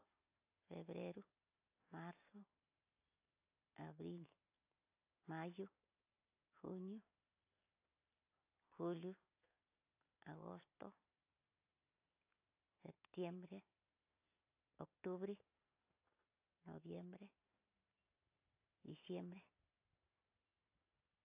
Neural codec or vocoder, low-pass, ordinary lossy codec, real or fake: none; 3.6 kHz; AAC, 32 kbps; real